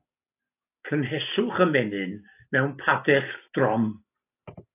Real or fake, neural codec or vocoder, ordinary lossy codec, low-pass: real; none; AAC, 24 kbps; 3.6 kHz